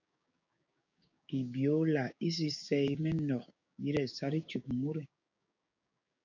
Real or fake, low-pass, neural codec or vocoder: fake; 7.2 kHz; codec, 16 kHz, 6 kbps, DAC